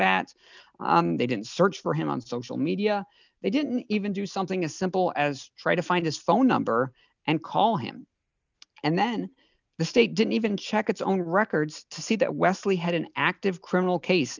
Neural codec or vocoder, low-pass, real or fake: none; 7.2 kHz; real